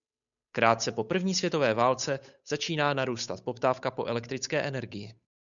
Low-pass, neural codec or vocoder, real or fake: 7.2 kHz; codec, 16 kHz, 8 kbps, FunCodec, trained on Chinese and English, 25 frames a second; fake